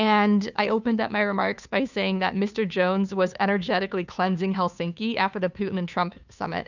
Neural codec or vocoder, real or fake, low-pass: codec, 16 kHz, 2 kbps, FunCodec, trained on Chinese and English, 25 frames a second; fake; 7.2 kHz